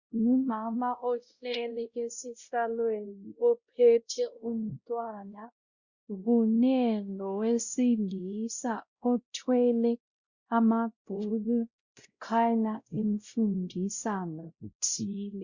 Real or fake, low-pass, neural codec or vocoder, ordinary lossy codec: fake; 7.2 kHz; codec, 16 kHz, 0.5 kbps, X-Codec, WavLM features, trained on Multilingual LibriSpeech; Opus, 64 kbps